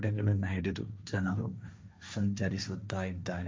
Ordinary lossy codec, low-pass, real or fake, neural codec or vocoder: none; none; fake; codec, 16 kHz, 1.1 kbps, Voila-Tokenizer